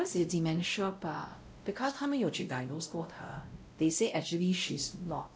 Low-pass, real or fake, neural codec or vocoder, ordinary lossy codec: none; fake; codec, 16 kHz, 0.5 kbps, X-Codec, WavLM features, trained on Multilingual LibriSpeech; none